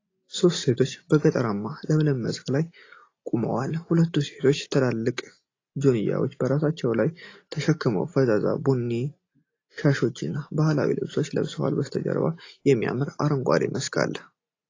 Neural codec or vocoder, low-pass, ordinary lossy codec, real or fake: none; 7.2 kHz; AAC, 32 kbps; real